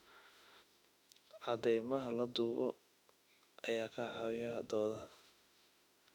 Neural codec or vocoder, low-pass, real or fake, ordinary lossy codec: autoencoder, 48 kHz, 32 numbers a frame, DAC-VAE, trained on Japanese speech; 19.8 kHz; fake; none